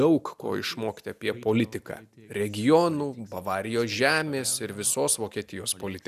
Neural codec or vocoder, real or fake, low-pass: none; real; 14.4 kHz